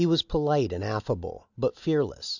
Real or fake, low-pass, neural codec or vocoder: real; 7.2 kHz; none